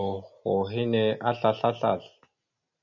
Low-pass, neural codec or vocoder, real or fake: 7.2 kHz; none; real